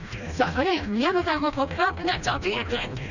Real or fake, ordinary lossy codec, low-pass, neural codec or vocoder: fake; none; 7.2 kHz; codec, 16 kHz, 1 kbps, FreqCodec, smaller model